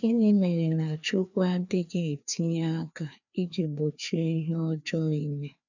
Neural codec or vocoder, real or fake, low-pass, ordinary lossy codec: codec, 16 kHz, 2 kbps, FreqCodec, larger model; fake; 7.2 kHz; none